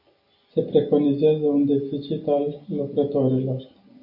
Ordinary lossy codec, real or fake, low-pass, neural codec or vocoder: AAC, 48 kbps; real; 5.4 kHz; none